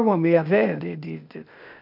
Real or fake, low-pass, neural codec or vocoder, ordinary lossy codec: fake; 5.4 kHz; codec, 16 kHz, 0.8 kbps, ZipCodec; none